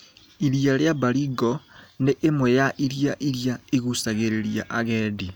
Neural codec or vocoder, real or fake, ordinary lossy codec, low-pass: none; real; none; none